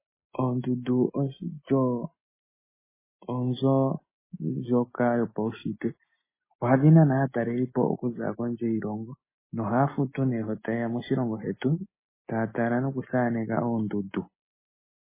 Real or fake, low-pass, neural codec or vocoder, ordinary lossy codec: real; 3.6 kHz; none; MP3, 16 kbps